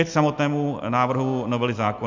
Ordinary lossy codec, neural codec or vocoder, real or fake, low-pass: MP3, 64 kbps; none; real; 7.2 kHz